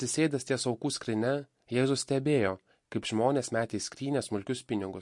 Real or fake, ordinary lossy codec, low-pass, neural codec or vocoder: real; MP3, 48 kbps; 10.8 kHz; none